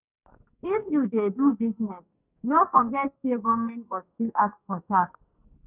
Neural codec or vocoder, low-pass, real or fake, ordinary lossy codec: codec, 44.1 kHz, 2.6 kbps, SNAC; 3.6 kHz; fake; none